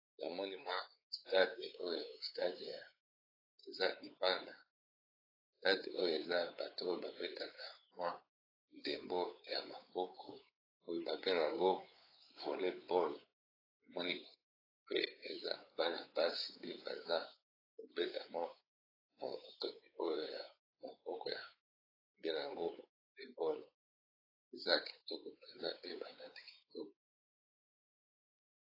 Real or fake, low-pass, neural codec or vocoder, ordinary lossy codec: fake; 5.4 kHz; codec, 16 kHz, 4 kbps, X-Codec, WavLM features, trained on Multilingual LibriSpeech; AAC, 24 kbps